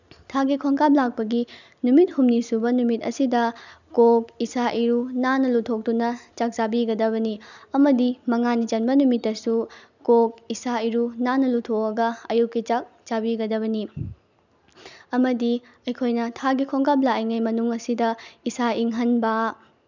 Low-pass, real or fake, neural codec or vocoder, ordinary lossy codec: 7.2 kHz; real; none; none